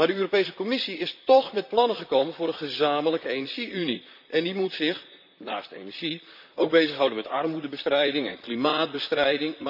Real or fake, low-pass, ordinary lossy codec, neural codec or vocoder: fake; 5.4 kHz; none; vocoder, 44.1 kHz, 128 mel bands, Pupu-Vocoder